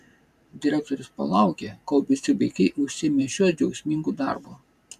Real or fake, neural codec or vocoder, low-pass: fake; vocoder, 44.1 kHz, 128 mel bands every 512 samples, BigVGAN v2; 14.4 kHz